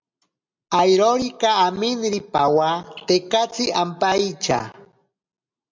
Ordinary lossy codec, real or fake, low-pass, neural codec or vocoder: MP3, 64 kbps; real; 7.2 kHz; none